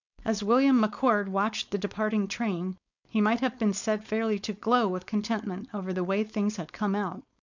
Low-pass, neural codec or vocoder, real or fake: 7.2 kHz; codec, 16 kHz, 4.8 kbps, FACodec; fake